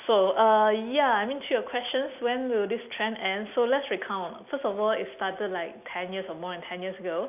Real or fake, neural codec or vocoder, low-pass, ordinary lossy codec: real; none; 3.6 kHz; none